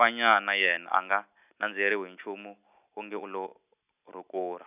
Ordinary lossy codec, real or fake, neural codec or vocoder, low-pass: none; real; none; 3.6 kHz